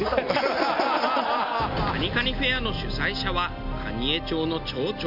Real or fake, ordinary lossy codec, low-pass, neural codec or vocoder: real; none; 5.4 kHz; none